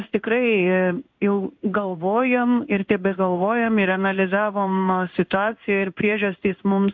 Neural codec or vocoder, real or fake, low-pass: codec, 16 kHz in and 24 kHz out, 1 kbps, XY-Tokenizer; fake; 7.2 kHz